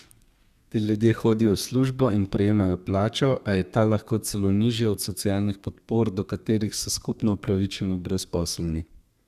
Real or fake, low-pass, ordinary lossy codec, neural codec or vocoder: fake; 14.4 kHz; Opus, 64 kbps; codec, 32 kHz, 1.9 kbps, SNAC